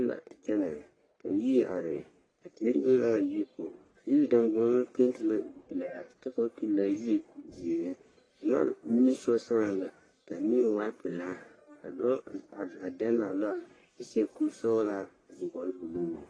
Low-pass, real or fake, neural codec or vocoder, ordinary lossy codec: 9.9 kHz; fake; codec, 44.1 kHz, 1.7 kbps, Pupu-Codec; AAC, 32 kbps